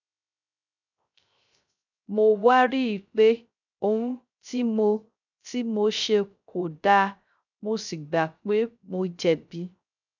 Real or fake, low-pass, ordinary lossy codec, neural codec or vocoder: fake; 7.2 kHz; none; codec, 16 kHz, 0.3 kbps, FocalCodec